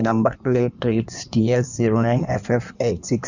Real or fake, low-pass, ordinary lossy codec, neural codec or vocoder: fake; 7.2 kHz; none; codec, 16 kHz in and 24 kHz out, 1.1 kbps, FireRedTTS-2 codec